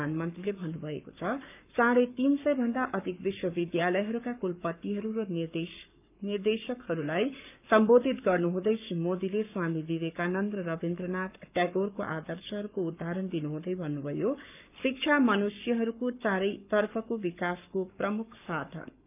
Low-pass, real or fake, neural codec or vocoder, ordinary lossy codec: 3.6 kHz; fake; codec, 44.1 kHz, 7.8 kbps, Pupu-Codec; none